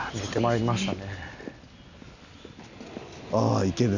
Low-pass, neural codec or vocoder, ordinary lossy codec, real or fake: 7.2 kHz; none; none; real